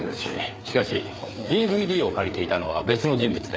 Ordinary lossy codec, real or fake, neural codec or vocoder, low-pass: none; fake; codec, 16 kHz, 4 kbps, FreqCodec, larger model; none